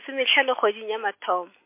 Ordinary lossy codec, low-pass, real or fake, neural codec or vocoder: MP3, 24 kbps; 3.6 kHz; real; none